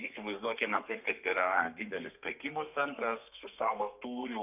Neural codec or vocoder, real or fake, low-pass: codec, 32 kHz, 1.9 kbps, SNAC; fake; 3.6 kHz